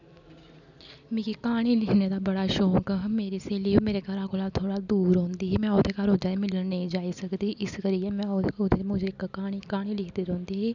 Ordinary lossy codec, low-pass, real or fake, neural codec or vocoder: none; 7.2 kHz; real; none